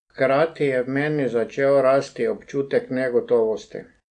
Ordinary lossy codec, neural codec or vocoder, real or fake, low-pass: AAC, 64 kbps; none; real; 9.9 kHz